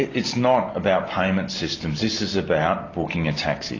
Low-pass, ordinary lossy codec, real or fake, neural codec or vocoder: 7.2 kHz; AAC, 32 kbps; real; none